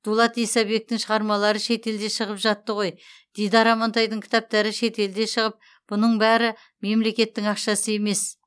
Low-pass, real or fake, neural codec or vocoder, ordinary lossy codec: none; real; none; none